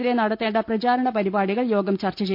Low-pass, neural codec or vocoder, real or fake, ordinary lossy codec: 5.4 kHz; none; real; none